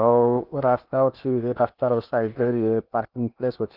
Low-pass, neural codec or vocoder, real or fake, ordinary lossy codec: 5.4 kHz; codec, 16 kHz in and 24 kHz out, 0.8 kbps, FocalCodec, streaming, 65536 codes; fake; Opus, 24 kbps